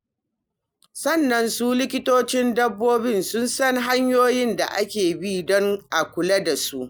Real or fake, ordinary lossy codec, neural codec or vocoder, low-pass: real; none; none; none